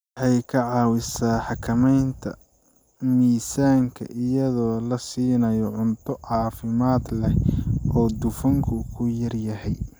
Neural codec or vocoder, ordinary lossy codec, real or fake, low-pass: none; none; real; none